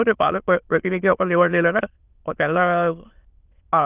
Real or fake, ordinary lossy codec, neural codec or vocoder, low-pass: fake; Opus, 32 kbps; autoencoder, 22.05 kHz, a latent of 192 numbers a frame, VITS, trained on many speakers; 3.6 kHz